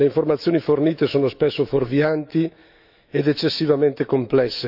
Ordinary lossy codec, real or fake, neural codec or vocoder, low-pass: none; fake; autoencoder, 48 kHz, 128 numbers a frame, DAC-VAE, trained on Japanese speech; 5.4 kHz